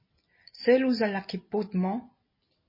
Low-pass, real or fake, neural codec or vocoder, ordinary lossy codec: 5.4 kHz; real; none; MP3, 24 kbps